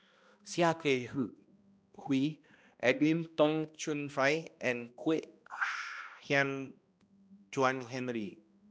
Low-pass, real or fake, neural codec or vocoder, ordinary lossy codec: none; fake; codec, 16 kHz, 1 kbps, X-Codec, HuBERT features, trained on balanced general audio; none